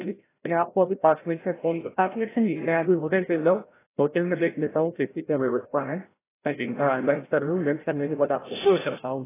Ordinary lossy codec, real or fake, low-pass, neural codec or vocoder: AAC, 16 kbps; fake; 3.6 kHz; codec, 16 kHz, 0.5 kbps, FreqCodec, larger model